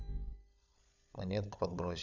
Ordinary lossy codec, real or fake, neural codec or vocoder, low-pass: none; fake; codec, 16 kHz, 16 kbps, FunCodec, trained on LibriTTS, 50 frames a second; 7.2 kHz